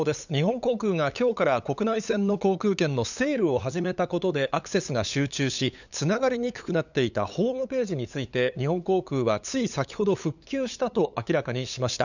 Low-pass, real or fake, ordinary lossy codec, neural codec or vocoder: 7.2 kHz; fake; none; codec, 16 kHz, 8 kbps, FunCodec, trained on LibriTTS, 25 frames a second